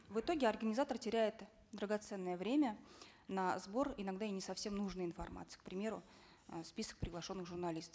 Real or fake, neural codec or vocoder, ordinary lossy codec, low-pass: real; none; none; none